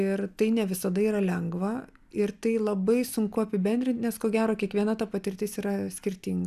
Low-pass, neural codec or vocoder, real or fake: 14.4 kHz; none; real